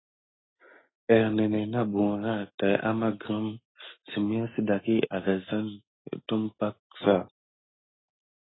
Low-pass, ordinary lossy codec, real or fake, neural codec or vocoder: 7.2 kHz; AAC, 16 kbps; real; none